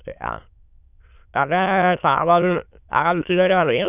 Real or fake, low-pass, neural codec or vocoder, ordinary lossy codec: fake; 3.6 kHz; autoencoder, 22.05 kHz, a latent of 192 numbers a frame, VITS, trained on many speakers; none